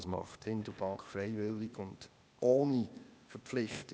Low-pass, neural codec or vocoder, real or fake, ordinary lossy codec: none; codec, 16 kHz, 0.8 kbps, ZipCodec; fake; none